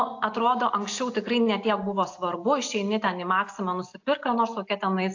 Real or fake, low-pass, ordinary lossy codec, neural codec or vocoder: real; 7.2 kHz; AAC, 48 kbps; none